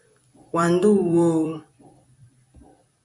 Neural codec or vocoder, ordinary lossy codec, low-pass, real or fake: vocoder, 44.1 kHz, 128 mel bands every 256 samples, BigVGAN v2; MP3, 64 kbps; 10.8 kHz; fake